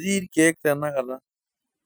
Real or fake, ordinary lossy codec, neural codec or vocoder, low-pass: real; none; none; none